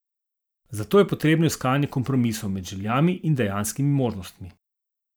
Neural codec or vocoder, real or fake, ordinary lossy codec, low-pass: vocoder, 44.1 kHz, 128 mel bands every 512 samples, BigVGAN v2; fake; none; none